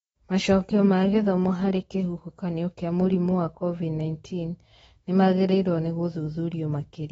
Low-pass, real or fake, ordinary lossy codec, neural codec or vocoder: 19.8 kHz; fake; AAC, 24 kbps; autoencoder, 48 kHz, 128 numbers a frame, DAC-VAE, trained on Japanese speech